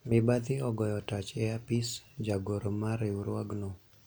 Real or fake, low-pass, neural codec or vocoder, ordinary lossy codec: real; none; none; none